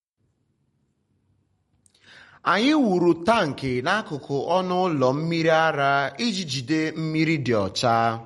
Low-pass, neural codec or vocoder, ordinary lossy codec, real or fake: 19.8 kHz; none; MP3, 48 kbps; real